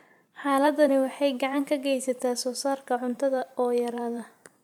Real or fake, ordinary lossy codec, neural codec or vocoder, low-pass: fake; MP3, 96 kbps; vocoder, 44.1 kHz, 128 mel bands every 512 samples, BigVGAN v2; 19.8 kHz